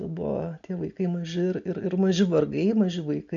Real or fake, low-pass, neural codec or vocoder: real; 7.2 kHz; none